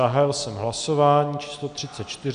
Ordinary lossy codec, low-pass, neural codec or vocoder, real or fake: MP3, 96 kbps; 9.9 kHz; none; real